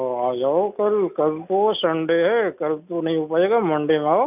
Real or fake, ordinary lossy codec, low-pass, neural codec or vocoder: real; none; 3.6 kHz; none